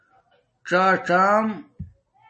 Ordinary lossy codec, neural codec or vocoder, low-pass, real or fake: MP3, 32 kbps; none; 9.9 kHz; real